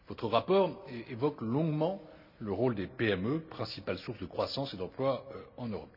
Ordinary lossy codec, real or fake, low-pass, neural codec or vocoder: none; real; 5.4 kHz; none